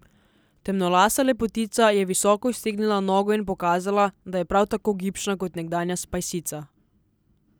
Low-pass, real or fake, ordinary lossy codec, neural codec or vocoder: none; real; none; none